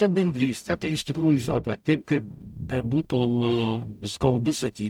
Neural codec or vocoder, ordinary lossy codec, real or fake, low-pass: codec, 44.1 kHz, 0.9 kbps, DAC; MP3, 96 kbps; fake; 19.8 kHz